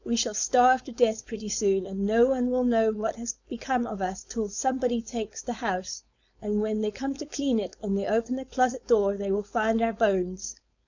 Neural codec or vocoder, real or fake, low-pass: codec, 16 kHz, 4.8 kbps, FACodec; fake; 7.2 kHz